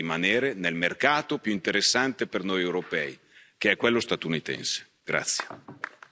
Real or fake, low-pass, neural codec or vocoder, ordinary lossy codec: real; none; none; none